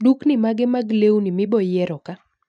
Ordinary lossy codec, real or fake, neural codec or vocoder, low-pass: none; real; none; 9.9 kHz